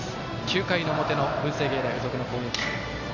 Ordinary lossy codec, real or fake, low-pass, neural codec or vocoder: none; real; 7.2 kHz; none